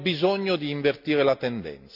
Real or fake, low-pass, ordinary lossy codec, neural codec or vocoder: real; 5.4 kHz; none; none